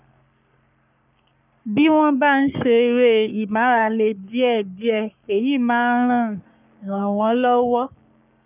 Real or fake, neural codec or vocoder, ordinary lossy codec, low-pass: fake; codec, 44.1 kHz, 3.4 kbps, Pupu-Codec; none; 3.6 kHz